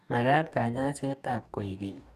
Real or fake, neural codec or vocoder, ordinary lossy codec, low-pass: fake; codec, 44.1 kHz, 2.6 kbps, DAC; none; 14.4 kHz